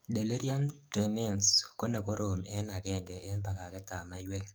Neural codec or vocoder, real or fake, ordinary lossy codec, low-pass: codec, 44.1 kHz, 7.8 kbps, DAC; fake; none; none